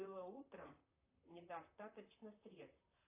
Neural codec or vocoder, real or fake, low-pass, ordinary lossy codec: vocoder, 44.1 kHz, 128 mel bands, Pupu-Vocoder; fake; 3.6 kHz; AAC, 24 kbps